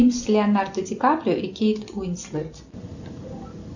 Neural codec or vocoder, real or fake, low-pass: none; real; 7.2 kHz